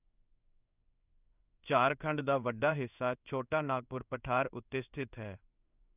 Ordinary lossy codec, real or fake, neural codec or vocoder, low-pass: none; fake; codec, 16 kHz in and 24 kHz out, 1 kbps, XY-Tokenizer; 3.6 kHz